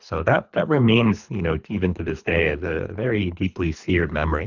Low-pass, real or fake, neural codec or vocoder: 7.2 kHz; fake; codec, 24 kHz, 3 kbps, HILCodec